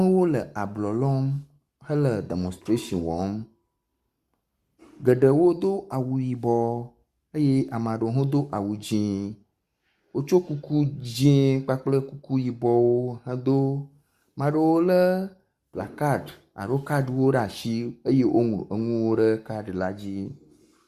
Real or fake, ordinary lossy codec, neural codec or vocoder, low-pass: fake; Opus, 64 kbps; codec, 44.1 kHz, 7.8 kbps, Pupu-Codec; 14.4 kHz